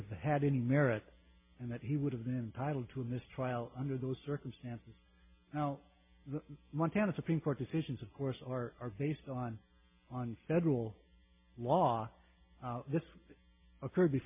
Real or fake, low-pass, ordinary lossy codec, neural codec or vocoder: real; 3.6 kHz; MP3, 32 kbps; none